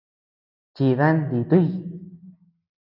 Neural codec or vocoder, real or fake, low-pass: none; real; 5.4 kHz